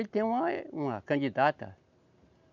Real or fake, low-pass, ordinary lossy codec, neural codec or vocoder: real; 7.2 kHz; none; none